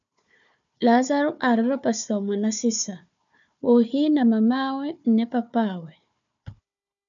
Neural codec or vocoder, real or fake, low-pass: codec, 16 kHz, 4 kbps, FunCodec, trained on Chinese and English, 50 frames a second; fake; 7.2 kHz